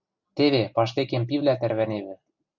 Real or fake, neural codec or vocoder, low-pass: real; none; 7.2 kHz